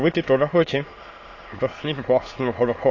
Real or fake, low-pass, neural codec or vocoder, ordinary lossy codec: fake; 7.2 kHz; autoencoder, 22.05 kHz, a latent of 192 numbers a frame, VITS, trained on many speakers; AAC, 32 kbps